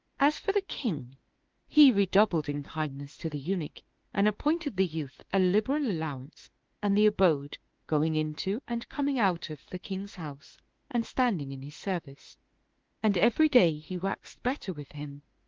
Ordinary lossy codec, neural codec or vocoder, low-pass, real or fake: Opus, 16 kbps; autoencoder, 48 kHz, 32 numbers a frame, DAC-VAE, trained on Japanese speech; 7.2 kHz; fake